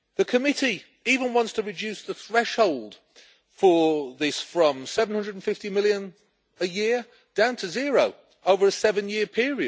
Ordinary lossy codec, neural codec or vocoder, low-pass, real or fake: none; none; none; real